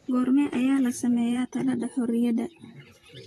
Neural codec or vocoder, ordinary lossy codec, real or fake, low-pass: vocoder, 44.1 kHz, 128 mel bands, Pupu-Vocoder; AAC, 32 kbps; fake; 19.8 kHz